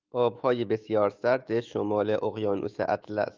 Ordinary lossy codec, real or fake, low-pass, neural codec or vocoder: Opus, 24 kbps; fake; 7.2 kHz; autoencoder, 48 kHz, 128 numbers a frame, DAC-VAE, trained on Japanese speech